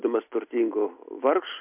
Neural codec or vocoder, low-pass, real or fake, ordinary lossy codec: none; 3.6 kHz; real; MP3, 32 kbps